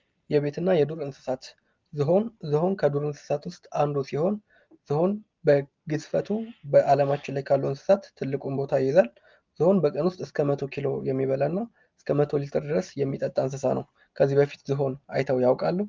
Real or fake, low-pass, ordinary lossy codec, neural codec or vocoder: real; 7.2 kHz; Opus, 24 kbps; none